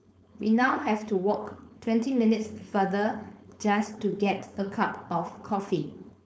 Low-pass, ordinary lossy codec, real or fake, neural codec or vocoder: none; none; fake; codec, 16 kHz, 4.8 kbps, FACodec